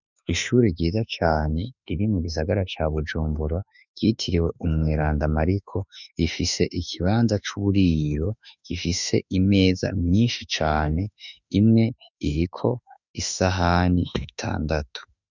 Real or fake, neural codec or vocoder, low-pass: fake; autoencoder, 48 kHz, 32 numbers a frame, DAC-VAE, trained on Japanese speech; 7.2 kHz